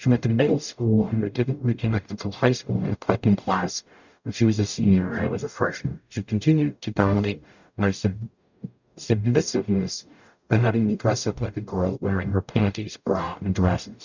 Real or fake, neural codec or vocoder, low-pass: fake; codec, 44.1 kHz, 0.9 kbps, DAC; 7.2 kHz